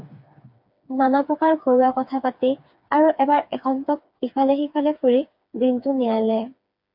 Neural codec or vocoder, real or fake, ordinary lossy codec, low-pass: codec, 16 kHz, 4 kbps, FreqCodec, smaller model; fake; MP3, 48 kbps; 5.4 kHz